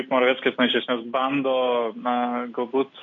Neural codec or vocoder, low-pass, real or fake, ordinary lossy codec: none; 7.2 kHz; real; AAC, 48 kbps